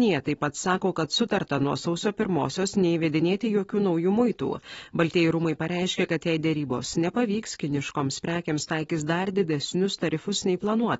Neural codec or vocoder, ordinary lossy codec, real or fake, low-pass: none; AAC, 24 kbps; real; 19.8 kHz